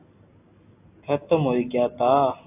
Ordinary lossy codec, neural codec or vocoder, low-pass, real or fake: AAC, 24 kbps; none; 3.6 kHz; real